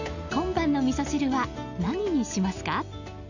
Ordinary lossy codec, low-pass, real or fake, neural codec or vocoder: none; 7.2 kHz; real; none